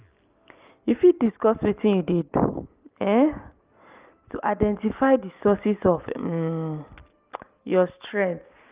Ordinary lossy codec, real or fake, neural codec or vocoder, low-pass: Opus, 32 kbps; real; none; 3.6 kHz